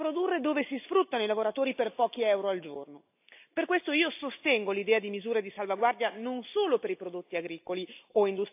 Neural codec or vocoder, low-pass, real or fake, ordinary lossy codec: none; 3.6 kHz; real; AAC, 32 kbps